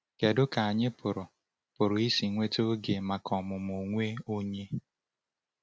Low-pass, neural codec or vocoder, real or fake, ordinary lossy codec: none; none; real; none